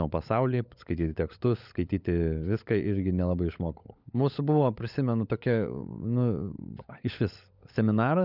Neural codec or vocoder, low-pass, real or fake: codec, 16 kHz, 16 kbps, FunCodec, trained on LibriTTS, 50 frames a second; 5.4 kHz; fake